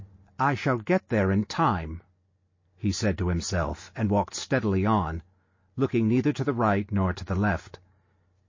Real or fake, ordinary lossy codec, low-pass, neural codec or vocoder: real; MP3, 32 kbps; 7.2 kHz; none